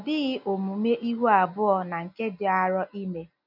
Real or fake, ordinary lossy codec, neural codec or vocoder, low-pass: real; none; none; 5.4 kHz